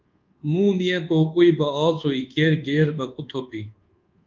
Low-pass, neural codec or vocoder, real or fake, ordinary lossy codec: 7.2 kHz; codec, 24 kHz, 1.2 kbps, DualCodec; fake; Opus, 32 kbps